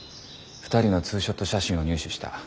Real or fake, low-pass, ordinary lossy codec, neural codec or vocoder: real; none; none; none